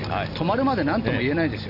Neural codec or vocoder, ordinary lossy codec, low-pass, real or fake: none; none; 5.4 kHz; real